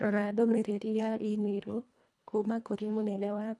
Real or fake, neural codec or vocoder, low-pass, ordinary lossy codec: fake; codec, 24 kHz, 1.5 kbps, HILCodec; none; none